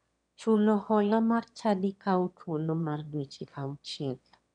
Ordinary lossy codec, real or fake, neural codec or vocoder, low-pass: none; fake; autoencoder, 22.05 kHz, a latent of 192 numbers a frame, VITS, trained on one speaker; 9.9 kHz